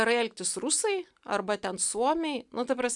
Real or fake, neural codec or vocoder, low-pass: real; none; 10.8 kHz